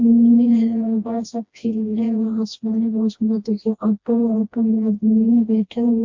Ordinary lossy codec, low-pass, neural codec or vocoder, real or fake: MP3, 48 kbps; 7.2 kHz; codec, 16 kHz, 1 kbps, FreqCodec, smaller model; fake